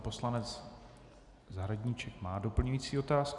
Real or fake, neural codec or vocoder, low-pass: real; none; 10.8 kHz